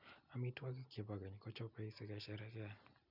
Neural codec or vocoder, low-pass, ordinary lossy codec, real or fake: none; 5.4 kHz; none; real